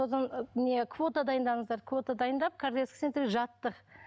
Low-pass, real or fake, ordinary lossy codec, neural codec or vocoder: none; real; none; none